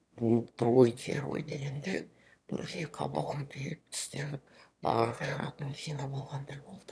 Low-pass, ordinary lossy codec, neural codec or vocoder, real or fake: none; none; autoencoder, 22.05 kHz, a latent of 192 numbers a frame, VITS, trained on one speaker; fake